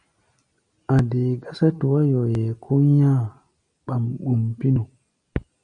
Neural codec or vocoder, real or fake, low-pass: none; real; 9.9 kHz